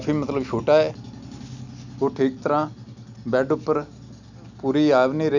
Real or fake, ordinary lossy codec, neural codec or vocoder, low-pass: real; none; none; 7.2 kHz